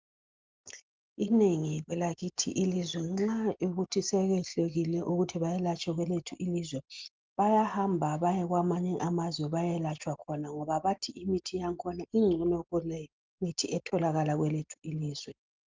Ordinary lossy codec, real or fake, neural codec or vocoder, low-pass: Opus, 32 kbps; real; none; 7.2 kHz